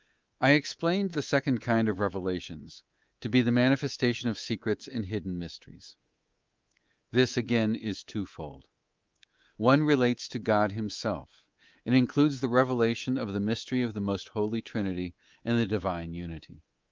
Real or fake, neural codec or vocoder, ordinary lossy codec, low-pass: fake; codec, 24 kHz, 3.1 kbps, DualCodec; Opus, 32 kbps; 7.2 kHz